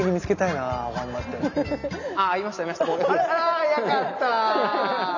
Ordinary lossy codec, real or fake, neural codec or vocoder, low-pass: none; real; none; 7.2 kHz